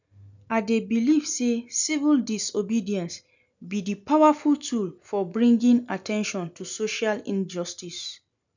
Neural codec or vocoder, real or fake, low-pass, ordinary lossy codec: none; real; 7.2 kHz; none